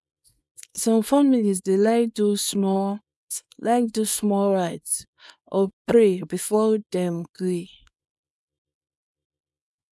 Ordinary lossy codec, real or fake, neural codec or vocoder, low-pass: none; fake; codec, 24 kHz, 0.9 kbps, WavTokenizer, small release; none